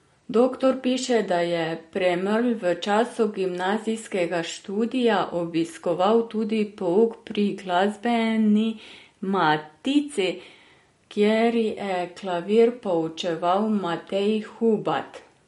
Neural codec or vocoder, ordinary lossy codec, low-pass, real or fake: none; MP3, 48 kbps; 19.8 kHz; real